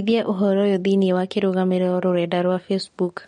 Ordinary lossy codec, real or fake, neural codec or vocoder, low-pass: MP3, 48 kbps; real; none; 19.8 kHz